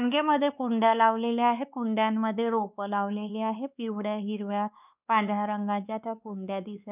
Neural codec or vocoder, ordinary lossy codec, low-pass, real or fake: codec, 16 kHz, 2 kbps, X-Codec, WavLM features, trained on Multilingual LibriSpeech; none; 3.6 kHz; fake